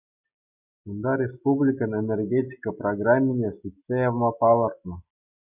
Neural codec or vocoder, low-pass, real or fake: none; 3.6 kHz; real